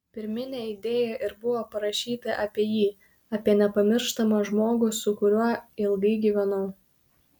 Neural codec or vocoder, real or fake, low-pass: none; real; 19.8 kHz